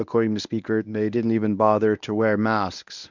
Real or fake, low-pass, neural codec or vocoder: fake; 7.2 kHz; codec, 24 kHz, 0.9 kbps, WavTokenizer, medium speech release version 1